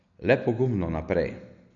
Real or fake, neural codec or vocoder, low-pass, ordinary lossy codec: real; none; 7.2 kHz; none